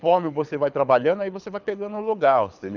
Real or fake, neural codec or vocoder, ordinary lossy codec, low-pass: fake; codec, 24 kHz, 6 kbps, HILCodec; none; 7.2 kHz